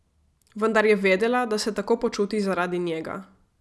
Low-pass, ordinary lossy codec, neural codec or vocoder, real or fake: none; none; none; real